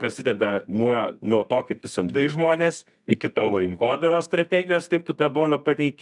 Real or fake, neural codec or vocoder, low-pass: fake; codec, 24 kHz, 0.9 kbps, WavTokenizer, medium music audio release; 10.8 kHz